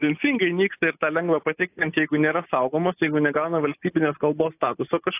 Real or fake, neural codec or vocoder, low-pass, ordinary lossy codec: real; none; 3.6 kHz; AAC, 24 kbps